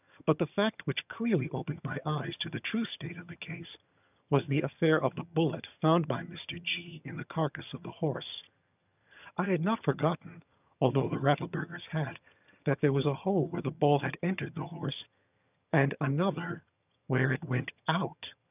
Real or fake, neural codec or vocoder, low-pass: fake; vocoder, 22.05 kHz, 80 mel bands, HiFi-GAN; 3.6 kHz